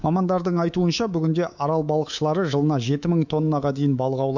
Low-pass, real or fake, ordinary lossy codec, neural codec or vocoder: 7.2 kHz; fake; none; codec, 24 kHz, 3.1 kbps, DualCodec